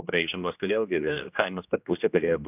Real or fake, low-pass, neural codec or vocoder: fake; 3.6 kHz; codec, 16 kHz, 1 kbps, X-Codec, HuBERT features, trained on general audio